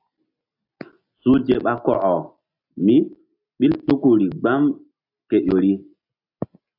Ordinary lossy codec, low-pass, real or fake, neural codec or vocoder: AAC, 48 kbps; 5.4 kHz; real; none